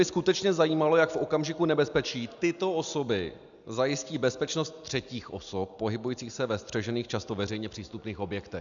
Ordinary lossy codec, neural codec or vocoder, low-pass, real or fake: MP3, 96 kbps; none; 7.2 kHz; real